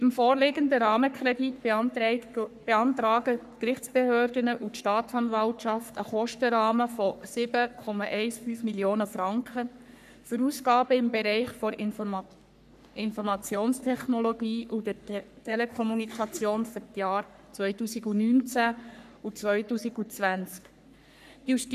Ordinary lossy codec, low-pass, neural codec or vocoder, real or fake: none; 14.4 kHz; codec, 44.1 kHz, 3.4 kbps, Pupu-Codec; fake